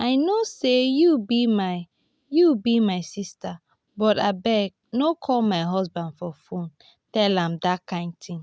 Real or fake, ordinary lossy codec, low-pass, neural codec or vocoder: real; none; none; none